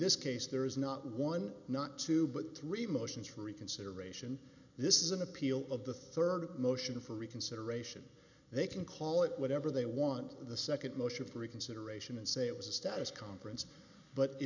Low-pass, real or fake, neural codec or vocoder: 7.2 kHz; real; none